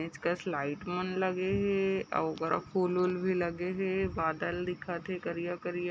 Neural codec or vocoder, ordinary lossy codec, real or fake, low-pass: none; none; real; none